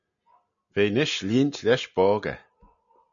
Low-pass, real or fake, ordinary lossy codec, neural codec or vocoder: 7.2 kHz; real; MP3, 64 kbps; none